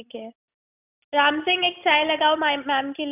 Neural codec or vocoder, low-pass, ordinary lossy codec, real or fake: none; 3.6 kHz; none; real